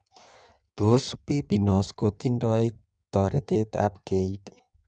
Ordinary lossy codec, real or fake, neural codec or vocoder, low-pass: none; fake; codec, 16 kHz in and 24 kHz out, 1.1 kbps, FireRedTTS-2 codec; 9.9 kHz